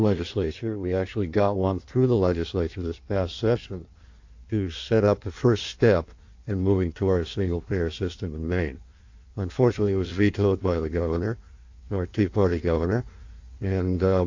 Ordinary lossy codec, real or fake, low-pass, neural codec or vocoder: AAC, 48 kbps; fake; 7.2 kHz; codec, 16 kHz in and 24 kHz out, 1.1 kbps, FireRedTTS-2 codec